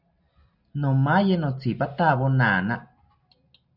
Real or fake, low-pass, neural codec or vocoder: real; 5.4 kHz; none